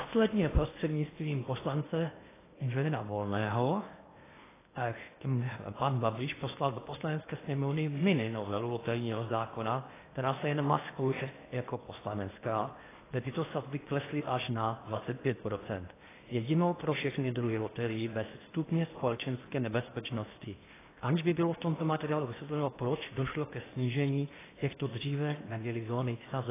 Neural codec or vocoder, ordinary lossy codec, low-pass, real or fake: codec, 16 kHz in and 24 kHz out, 0.8 kbps, FocalCodec, streaming, 65536 codes; AAC, 16 kbps; 3.6 kHz; fake